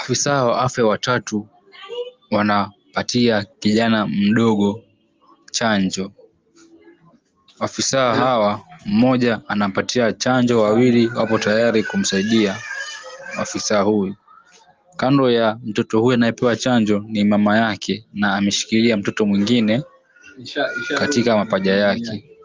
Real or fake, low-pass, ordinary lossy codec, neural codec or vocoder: real; 7.2 kHz; Opus, 24 kbps; none